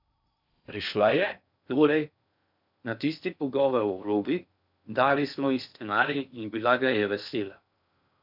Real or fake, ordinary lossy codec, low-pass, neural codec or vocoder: fake; none; 5.4 kHz; codec, 16 kHz in and 24 kHz out, 0.6 kbps, FocalCodec, streaming, 4096 codes